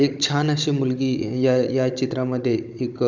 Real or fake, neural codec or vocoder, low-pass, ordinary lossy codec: fake; codec, 16 kHz, 16 kbps, FunCodec, trained on Chinese and English, 50 frames a second; 7.2 kHz; none